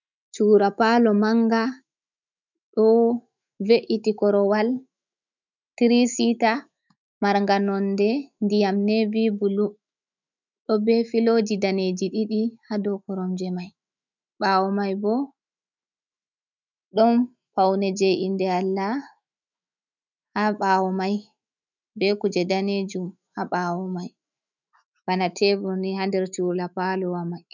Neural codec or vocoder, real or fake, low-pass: autoencoder, 48 kHz, 128 numbers a frame, DAC-VAE, trained on Japanese speech; fake; 7.2 kHz